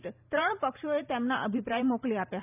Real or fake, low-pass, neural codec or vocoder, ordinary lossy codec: fake; 3.6 kHz; vocoder, 44.1 kHz, 128 mel bands every 512 samples, BigVGAN v2; none